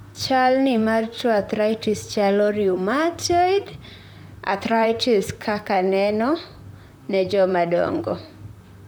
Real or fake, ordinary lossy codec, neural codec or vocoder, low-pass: fake; none; vocoder, 44.1 kHz, 128 mel bands, Pupu-Vocoder; none